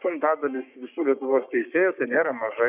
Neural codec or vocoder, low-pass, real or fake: codec, 44.1 kHz, 3.4 kbps, Pupu-Codec; 3.6 kHz; fake